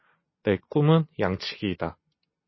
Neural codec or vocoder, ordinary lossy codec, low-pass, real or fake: none; MP3, 24 kbps; 7.2 kHz; real